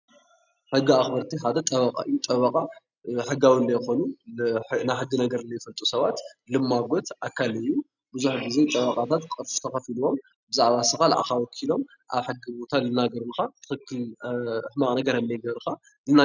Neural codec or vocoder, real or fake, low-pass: none; real; 7.2 kHz